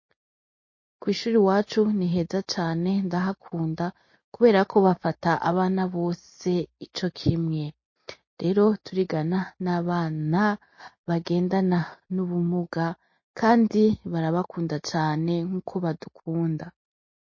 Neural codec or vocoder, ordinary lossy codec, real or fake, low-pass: none; MP3, 32 kbps; real; 7.2 kHz